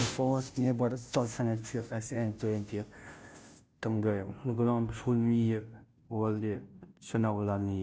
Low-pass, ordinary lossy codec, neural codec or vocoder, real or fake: none; none; codec, 16 kHz, 0.5 kbps, FunCodec, trained on Chinese and English, 25 frames a second; fake